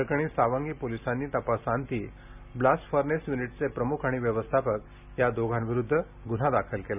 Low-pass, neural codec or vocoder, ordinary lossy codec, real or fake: 3.6 kHz; none; none; real